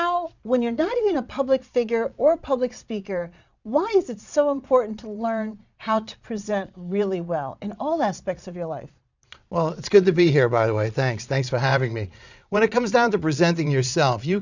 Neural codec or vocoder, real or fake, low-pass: vocoder, 22.05 kHz, 80 mel bands, Vocos; fake; 7.2 kHz